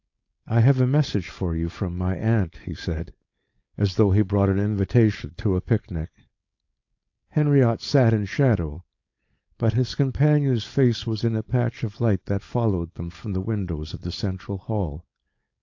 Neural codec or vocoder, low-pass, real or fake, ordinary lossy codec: codec, 16 kHz, 4.8 kbps, FACodec; 7.2 kHz; fake; AAC, 48 kbps